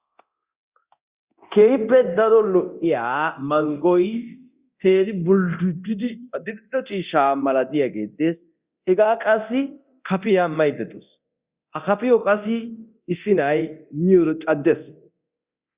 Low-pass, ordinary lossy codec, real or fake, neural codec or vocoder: 3.6 kHz; Opus, 64 kbps; fake; codec, 24 kHz, 0.9 kbps, DualCodec